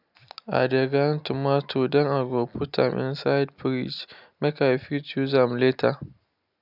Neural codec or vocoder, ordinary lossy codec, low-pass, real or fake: none; none; 5.4 kHz; real